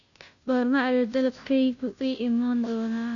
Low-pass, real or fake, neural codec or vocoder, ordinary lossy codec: 7.2 kHz; fake; codec, 16 kHz, 0.5 kbps, FunCodec, trained on Chinese and English, 25 frames a second; none